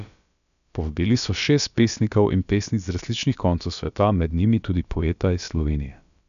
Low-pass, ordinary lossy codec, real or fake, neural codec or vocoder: 7.2 kHz; none; fake; codec, 16 kHz, about 1 kbps, DyCAST, with the encoder's durations